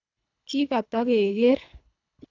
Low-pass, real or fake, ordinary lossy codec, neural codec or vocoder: 7.2 kHz; fake; none; codec, 24 kHz, 3 kbps, HILCodec